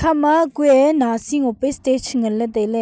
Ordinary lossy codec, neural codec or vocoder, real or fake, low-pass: none; none; real; none